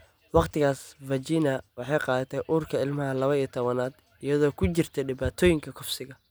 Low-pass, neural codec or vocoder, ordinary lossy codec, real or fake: none; none; none; real